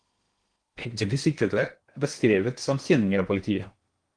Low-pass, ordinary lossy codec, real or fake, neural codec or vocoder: 9.9 kHz; Opus, 24 kbps; fake; codec, 16 kHz in and 24 kHz out, 0.8 kbps, FocalCodec, streaming, 65536 codes